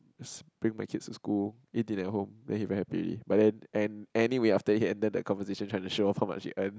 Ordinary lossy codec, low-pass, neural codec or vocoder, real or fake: none; none; none; real